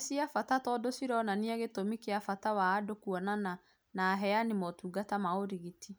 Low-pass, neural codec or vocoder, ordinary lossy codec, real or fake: none; none; none; real